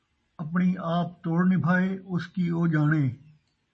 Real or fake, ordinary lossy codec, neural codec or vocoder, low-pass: real; MP3, 32 kbps; none; 10.8 kHz